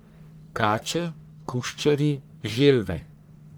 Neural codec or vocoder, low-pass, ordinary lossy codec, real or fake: codec, 44.1 kHz, 1.7 kbps, Pupu-Codec; none; none; fake